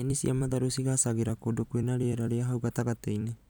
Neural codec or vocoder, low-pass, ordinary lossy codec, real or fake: vocoder, 44.1 kHz, 128 mel bands every 256 samples, BigVGAN v2; none; none; fake